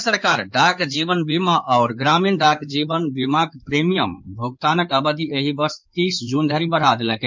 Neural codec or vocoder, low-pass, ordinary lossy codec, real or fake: codec, 16 kHz in and 24 kHz out, 2.2 kbps, FireRedTTS-2 codec; 7.2 kHz; MP3, 64 kbps; fake